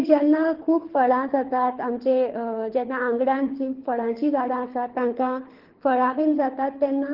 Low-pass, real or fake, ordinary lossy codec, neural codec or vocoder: 5.4 kHz; fake; Opus, 16 kbps; codec, 24 kHz, 6 kbps, HILCodec